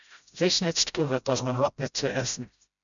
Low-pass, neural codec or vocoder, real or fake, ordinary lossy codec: 7.2 kHz; codec, 16 kHz, 0.5 kbps, FreqCodec, smaller model; fake; MP3, 96 kbps